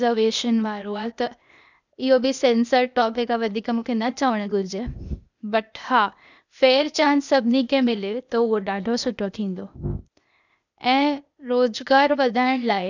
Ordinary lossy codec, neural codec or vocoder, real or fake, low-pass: none; codec, 16 kHz, 0.8 kbps, ZipCodec; fake; 7.2 kHz